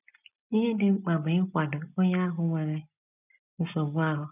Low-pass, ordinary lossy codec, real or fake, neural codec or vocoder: 3.6 kHz; none; real; none